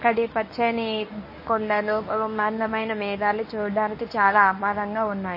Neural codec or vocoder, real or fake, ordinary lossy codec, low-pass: codec, 24 kHz, 0.9 kbps, WavTokenizer, medium speech release version 1; fake; MP3, 24 kbps; 5.4 kHz